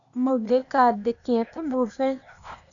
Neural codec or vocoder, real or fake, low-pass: codec, 16 kHz, 0.8 kbps, ZipCodec; fake; 7.2 kHz